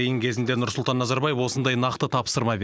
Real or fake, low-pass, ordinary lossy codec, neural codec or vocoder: real; none; none; none